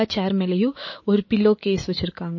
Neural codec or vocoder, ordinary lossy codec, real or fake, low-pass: autoencoder, 48 kHz, 128 numbers a frame, DAC-VAE, trained on Japanese speech; MP3, 32 kbps; fake; 7.2 kHz